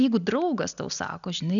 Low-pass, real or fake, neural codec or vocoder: 7.2 kHz; real; none